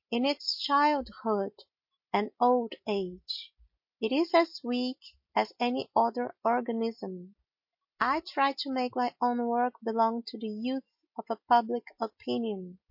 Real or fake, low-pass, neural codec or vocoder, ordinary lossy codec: real; 5.4 kHz; none; MP3, 32 kbps